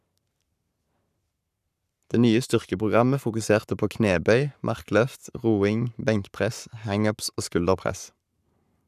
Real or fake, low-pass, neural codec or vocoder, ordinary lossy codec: fake; 14.4 kHz; codec, 44.1 kHz, 7.8 kbps, Pupu-Codec; none